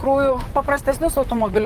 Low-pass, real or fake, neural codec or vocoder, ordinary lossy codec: 14.4 kHz; fake; vocoder, 44.1 kHz, 128 mel bands, Pupu-Vocoder; Opus, 32 kbps